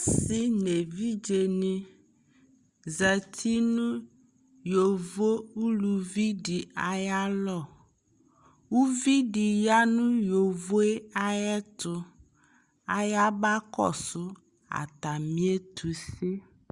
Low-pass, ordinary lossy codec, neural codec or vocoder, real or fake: 10.8 kHz; Opus, 64 kbps; none; real